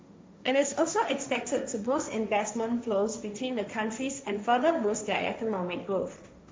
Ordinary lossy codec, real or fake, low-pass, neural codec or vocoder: none; fake; none; codec, 16 kHz, 1.1 kbps, Voila-Tokenizer